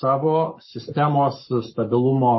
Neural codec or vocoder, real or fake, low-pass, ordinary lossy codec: codec, 44.1 kHz, 7.8 kbps, Pupu-Codec; fake; 7.2 kHz; MP3, 24 kbps